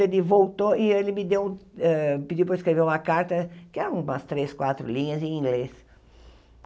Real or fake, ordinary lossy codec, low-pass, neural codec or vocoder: real; none; none; none